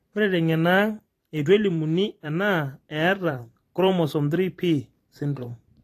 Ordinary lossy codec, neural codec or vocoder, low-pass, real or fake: AAC, 48 kbps; vocoder, 44.1 kHz, 128 mel bands every 512 samples, BigVGAN v2; 14.4 kHz; fake